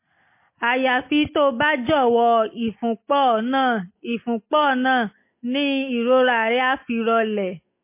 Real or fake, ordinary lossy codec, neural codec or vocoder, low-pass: real; MP3, 24 kbps; none; 3.6 kHz